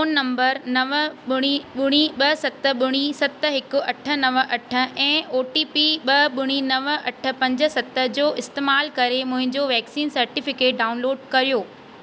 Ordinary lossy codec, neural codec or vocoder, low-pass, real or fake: none; none; none; real